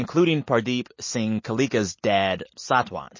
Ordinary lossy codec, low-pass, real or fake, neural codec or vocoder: MP3, 32 kbps; 7.2 kHz; real; none